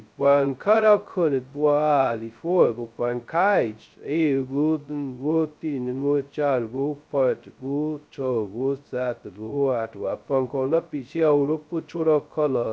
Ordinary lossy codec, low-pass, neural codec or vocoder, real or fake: none; none; codec, 16 kHz, 0.2 kbps, FocalCodec; fake